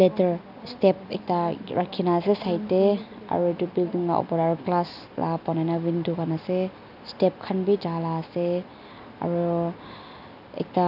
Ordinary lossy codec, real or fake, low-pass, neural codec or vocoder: AAC, 48 kbps; real; 5.4 kHz; none